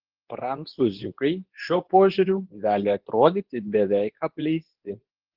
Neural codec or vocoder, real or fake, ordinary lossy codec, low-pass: codec, 24 kHz, 0.9 kbps, WavTokenizer, medium speech release version 2; fake; Opus, 16 kbps; 5.4 kHz